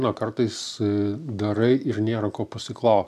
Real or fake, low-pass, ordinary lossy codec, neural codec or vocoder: real; 14.4 kHz; MP3, 96 kbps; none